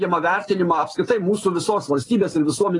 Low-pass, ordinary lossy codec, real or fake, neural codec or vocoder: 10.8 kHz; AAC, 32 kbps; real; none